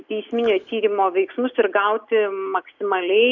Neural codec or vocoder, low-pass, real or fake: none; 7.2 kHz; real